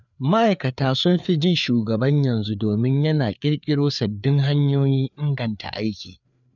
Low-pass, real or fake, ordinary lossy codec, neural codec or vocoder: 7.2 kHz; fake; none; codec, 16 kHz, 4 kbps, FreqCodec, larger model